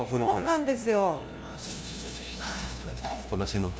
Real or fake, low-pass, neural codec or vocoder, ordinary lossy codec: fake; none; codec, 16 kHz, 0.5 kbps, FunCodec, trained on LibriTTS, 25 frames a second; none